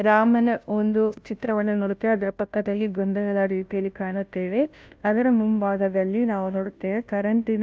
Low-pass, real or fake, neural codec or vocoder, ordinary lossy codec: none; fake; codec, 16 kHz, 0.5 kbps, FunCodec, trained on Chinese and English, 25 frames a second; none